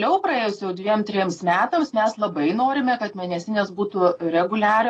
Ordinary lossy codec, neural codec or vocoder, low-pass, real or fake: AAC, 32 kbps; none; 9.9 kHz; real